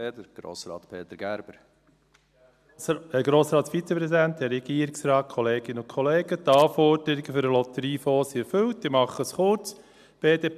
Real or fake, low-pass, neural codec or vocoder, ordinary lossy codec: real; 14.4 kHz; none; none